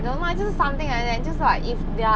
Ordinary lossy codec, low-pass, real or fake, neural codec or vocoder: none; none; real; none